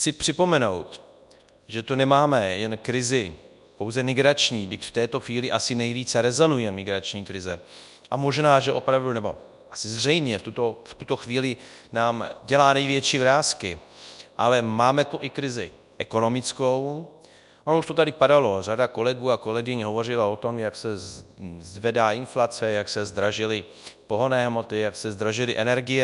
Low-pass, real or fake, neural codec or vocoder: 10.8 kHz; fake; codec, 24 kHz, 0.9 kbps, WavTokenizer, large speech release